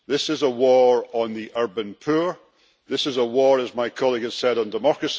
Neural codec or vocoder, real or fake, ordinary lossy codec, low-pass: none; real; none; none